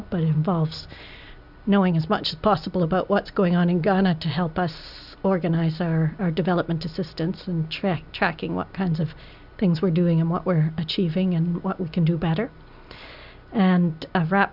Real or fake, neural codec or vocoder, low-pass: real; none; 5.4 kHz